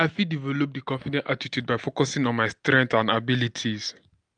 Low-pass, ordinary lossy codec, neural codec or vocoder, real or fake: 9.9 kHz; none; none; real